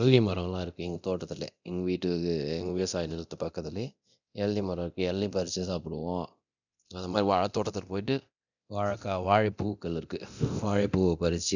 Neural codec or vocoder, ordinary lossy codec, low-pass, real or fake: codec, 24 kHz, 0.9 kbps, DualCodec; none; 7.2 kHz; fake